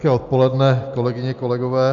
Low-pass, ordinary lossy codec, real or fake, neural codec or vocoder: 7.2 kHz; Opus, 64 kbps; real; none